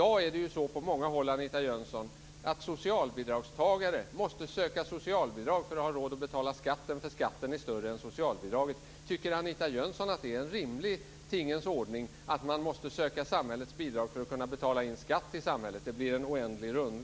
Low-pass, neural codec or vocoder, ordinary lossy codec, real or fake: none; none; none; real